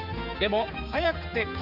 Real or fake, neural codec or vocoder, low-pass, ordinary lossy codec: fake; codec, 16 kHz, 4 kbps, X-Codec, HuBERT features, trained on general audio; 5.4 kHz; none